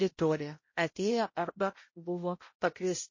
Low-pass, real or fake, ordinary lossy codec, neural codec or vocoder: 7.2 kHz; fake; MP3, 32 kbps; codec, 16 kHz, 0.5 kbps, X-Codec, HuBERT features, trained on balanced general audio